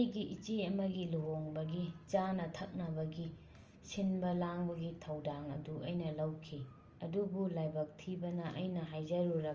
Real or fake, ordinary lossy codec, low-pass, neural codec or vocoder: real; Opus, 24 kbps; 7.2 kHz; none